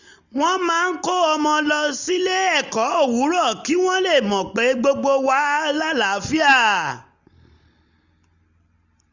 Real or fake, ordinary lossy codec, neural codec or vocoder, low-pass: real; none; none; 7.2 kHz